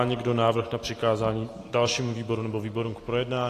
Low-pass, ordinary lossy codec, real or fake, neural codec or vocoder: 14.4 kHz; AAC, 48 kbps; real; none